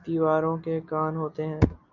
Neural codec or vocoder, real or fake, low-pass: none; real; 7.2 kHz